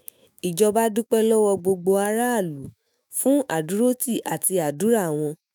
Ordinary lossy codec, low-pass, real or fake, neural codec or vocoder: none; none; fake; autoencoder, 48 kHz, 128 numbers a frame, DAC-VAE, trained on Japanese speech